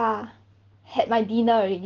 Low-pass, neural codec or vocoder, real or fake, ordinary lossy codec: 7.2 kHz; none; real; Opus, 16 kbps